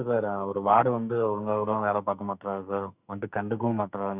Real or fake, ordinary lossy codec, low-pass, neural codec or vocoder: fake; none; 3.6 kHz; codec, 44.1 kHz, 2.6 kbps, SNAC